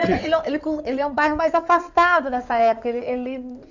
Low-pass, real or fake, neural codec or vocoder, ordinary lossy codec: 7.2 kHz; fake; codec, 16 kHz in and 24 kHz out, 2.2 kbps, FireRedTTS-2 codec; none